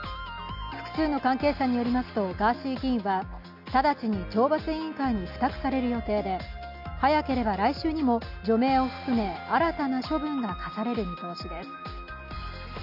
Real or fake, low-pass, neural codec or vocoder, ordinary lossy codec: real; 5.4 kHz; none; AAC, 48 kbps